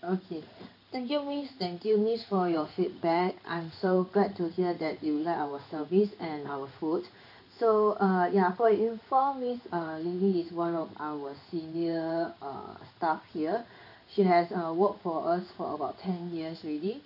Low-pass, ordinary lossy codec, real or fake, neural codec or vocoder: 5.4 kHz; none; fake; codec, 16 kHz in and 24 kHz out, 1 kbps, XY-Tokenizer